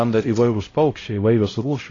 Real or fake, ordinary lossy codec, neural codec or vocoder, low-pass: fake; AAC, 32 kbps; codec, 16 kHz, 0.5 kbps, X-Codec, HuBERT features, trained on LibriSpeech; 7.2 kHz